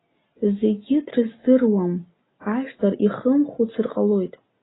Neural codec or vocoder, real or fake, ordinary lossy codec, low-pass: none; real; AAC, 16 kbps; 7.2 kHz